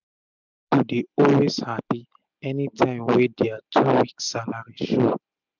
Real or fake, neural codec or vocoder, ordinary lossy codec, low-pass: real; none; none; 7.2 kHz